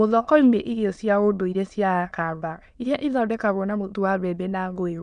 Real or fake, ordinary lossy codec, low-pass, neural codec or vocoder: fake; none; 9.9 kHz; autoencoder, 22.05 kHz, a latent of 192 numbers a frame, VITS, trained on many speakers